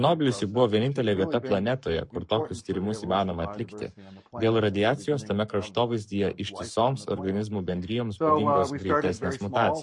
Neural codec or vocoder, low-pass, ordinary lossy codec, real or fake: codec, 44.1 kHz, 7.8 kbps, Pupu-Codec; 10.8 kHz; MP3, 48 kbps; fake